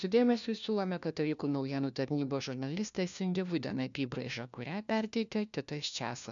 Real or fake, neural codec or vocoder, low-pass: fake; codec, 16 kHz, 1 kbps, FunCodec, trained on LibriTTS, 50 frames a second; 7.2 kHz